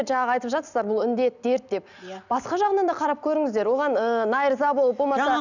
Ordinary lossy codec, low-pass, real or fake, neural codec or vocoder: none; 7.2 kHz; real; none